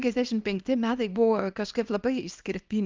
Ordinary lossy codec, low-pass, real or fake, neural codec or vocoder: Opus, 24 kbps; 7.2 kHz; fake; codec, 24 kHz, 0.9 kbps, WavTokenizer, small release